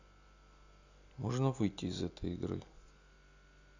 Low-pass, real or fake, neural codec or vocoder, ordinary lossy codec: 7.2 kHz; real; none; none